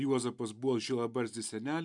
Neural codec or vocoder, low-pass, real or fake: none; 10.8 kHz; real